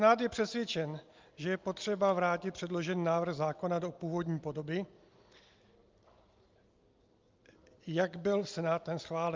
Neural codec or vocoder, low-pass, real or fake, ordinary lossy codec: none; 7.2 kHz; real; Opus, 24 kbps